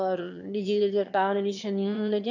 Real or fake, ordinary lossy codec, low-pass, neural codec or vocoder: fake; none; 7.2 kHz; autoencoder, 22.05 kHz, a latent of 192 numbers a frame, VITS, trained on one speaker